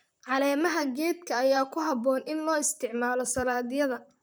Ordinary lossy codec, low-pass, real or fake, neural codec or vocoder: none; none; fake; vocoder, 44.1 kHz, 128 mel bands, Pupu-Vocoder